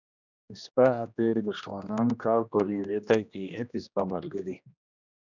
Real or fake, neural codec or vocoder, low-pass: fake; codec, 16 kHz, 1 kbps, X-Codec, HuBERT features, trained on general audio; 7.2 kHz